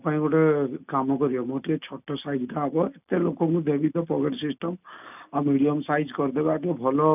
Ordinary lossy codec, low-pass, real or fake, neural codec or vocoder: none; 3.6 kHz; real; none